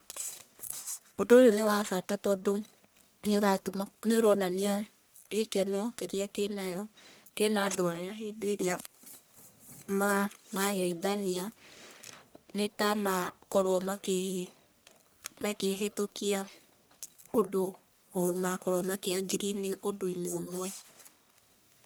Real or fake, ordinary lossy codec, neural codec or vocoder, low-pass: fake; none; codec, 44.1 kHz, 1.7 kbps, Pupu-Codec; none